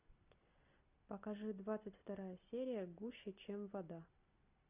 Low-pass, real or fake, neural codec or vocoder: 3.6 kHz; real; none